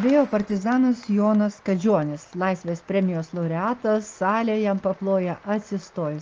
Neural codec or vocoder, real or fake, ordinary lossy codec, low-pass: none; real; Opus, 24 kbps; 7.2 kHz